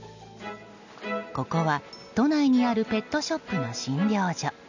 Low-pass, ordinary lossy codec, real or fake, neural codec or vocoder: 7.2 kHz; none; real; none